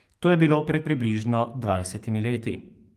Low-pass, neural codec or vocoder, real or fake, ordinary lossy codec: 14.4 kHz; codec, 44.1 kHz, 2.6 kbps, SNAC; fake; Opus, 32 kbps